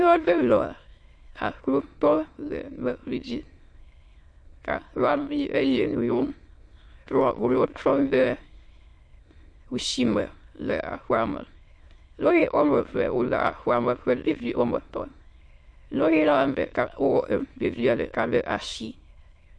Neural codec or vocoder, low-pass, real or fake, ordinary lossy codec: autoencoder, 22.05 kHz, a latent of 192 numbers a frame, VITS, trained on many speakers; 9.9 kHz; fake; MP3, 48 kbps